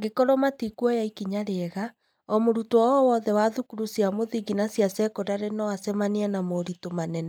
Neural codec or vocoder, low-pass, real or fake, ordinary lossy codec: none; 19.8 kHz; real; none